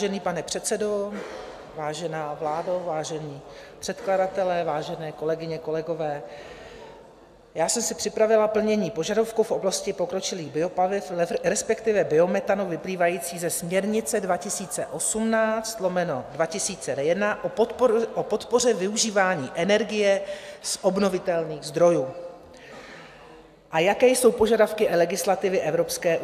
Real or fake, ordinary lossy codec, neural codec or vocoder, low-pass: real; AAC, 96 kbps; none; 14.4 kHz